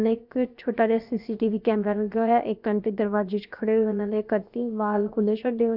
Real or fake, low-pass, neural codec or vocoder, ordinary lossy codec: fake; 5.4 kHz; codec, 16 kHz, 0.7 kbps, FocalCodec; Opus, 64 kbps